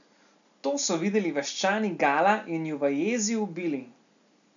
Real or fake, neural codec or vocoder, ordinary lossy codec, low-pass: real; none; none; 7.2 kHz